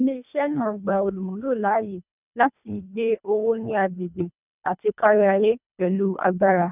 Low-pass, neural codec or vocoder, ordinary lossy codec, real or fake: 3.6 kHz; codec, 24 kHz, 1.5 kbps, HILCodec; none; fake